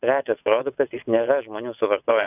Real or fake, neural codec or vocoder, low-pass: fake; vocoder, 22.05 kHz, 80 mel bands, WaveNeXt; 3.6 kHz